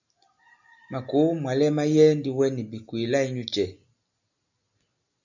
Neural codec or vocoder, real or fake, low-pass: none; real; 7.2 kHz